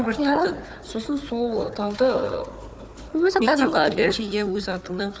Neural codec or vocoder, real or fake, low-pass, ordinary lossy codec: codec, 16 kHz, 4 kbps, FunCodec, trained on Chinese and English, 50 frames a second; fake; none; none